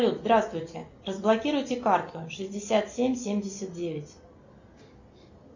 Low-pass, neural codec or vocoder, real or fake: 7.2 kHz; none; real